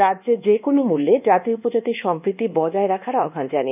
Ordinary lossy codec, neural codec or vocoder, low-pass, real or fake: none; codec, 24 kHz, 1.2 kbps, DualCodec; 3.6 kHz; fake